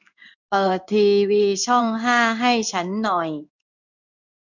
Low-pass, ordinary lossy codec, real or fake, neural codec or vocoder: 7.2 kHz; none; fake; codec, 16 kHz in and 24 kHz out, 1 kbps, XY-Tokenizer